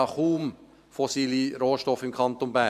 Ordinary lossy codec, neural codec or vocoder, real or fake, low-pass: none; vocoder, 44.1 kHz, 128 mel bands every 512 samples, BigVGAN v2; fake; 14.4 kHz